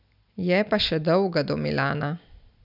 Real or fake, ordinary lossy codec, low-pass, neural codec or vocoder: real; none; 5.4 kHz; none